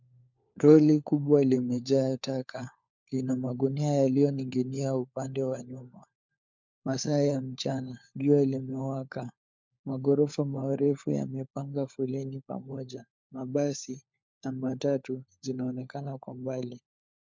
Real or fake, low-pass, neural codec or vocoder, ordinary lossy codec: fake; 7.2 kHz; codec, 16 kHz, 4 kbps, FunCodec, trained on LibriTTS, 50 frames a second; MP3, 64 kbps